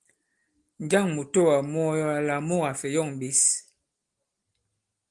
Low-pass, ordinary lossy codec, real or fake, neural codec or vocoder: 10.8 kHz; Opus, 24 kbps; real; none